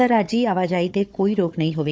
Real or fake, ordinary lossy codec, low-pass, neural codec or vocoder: fake; none; none; codec, 16 kHz, 4 kbps, FunCodec, trained on Chinese and English, 50 frames a second